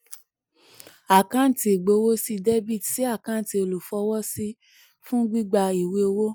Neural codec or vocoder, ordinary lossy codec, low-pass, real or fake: none; none; none; real